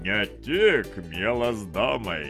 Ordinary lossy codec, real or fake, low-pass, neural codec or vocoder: Opus, 32 kbps; real; 14.4 kHz; none